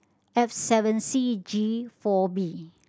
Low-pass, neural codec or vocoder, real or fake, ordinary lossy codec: none; none; real; none